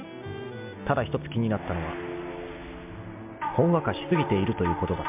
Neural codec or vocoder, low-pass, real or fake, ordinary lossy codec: none; 3.6 kHz; real; MP3, 32 kbps